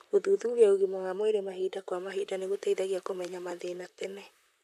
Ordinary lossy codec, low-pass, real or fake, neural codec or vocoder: none; 14.4 kHz; fake; vocoder, 44.1 kHz, 128 mel bands, Pupu-Vocoder